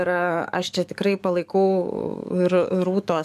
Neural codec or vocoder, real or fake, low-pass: codec, 44.1 kHz, 7.8 kbps, DAC; fake; 14.4 kHz